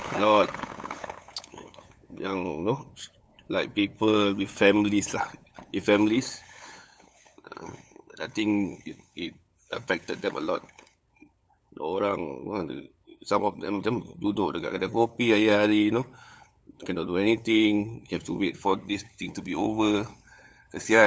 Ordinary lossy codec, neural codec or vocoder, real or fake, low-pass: none; codec, 16 kHz, 8 kbps, FunCodec, trained on LibriTTS, 25 frames a second; fake; none